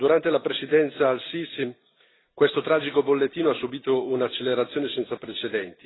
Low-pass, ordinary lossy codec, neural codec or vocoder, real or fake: 7.2 kHz; AAC, 16 kbps; none; real